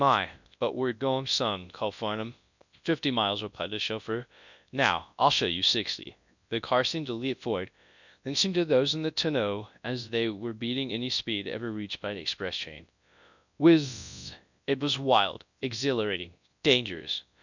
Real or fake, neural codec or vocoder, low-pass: fake; codec, 24 kHz, 0.9 kbps, WavTokenizer, large speech release; 7.2 kHz